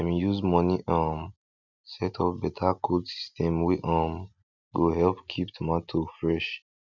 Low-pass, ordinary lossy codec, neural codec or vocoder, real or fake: 7.2 kHz; none; none; real